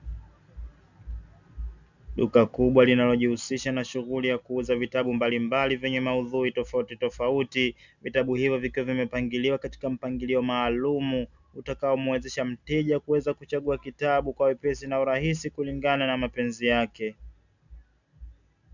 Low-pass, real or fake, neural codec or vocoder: 7.2 kHz; real; none